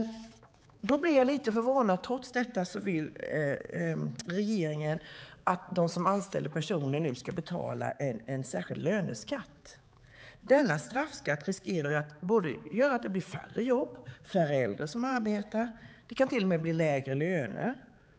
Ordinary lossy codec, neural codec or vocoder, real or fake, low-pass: none; codec, 16 kHz, 4 kbps, X-Codec, HuBERT features, trained on balanced general audio; fake; none